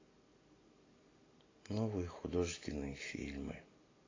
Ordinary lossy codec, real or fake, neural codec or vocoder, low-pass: AAC, 32 kbps; real; none; 7.2 kHz